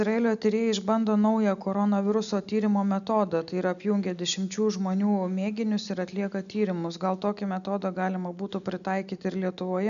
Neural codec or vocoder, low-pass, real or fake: none; 7.2 kHz; real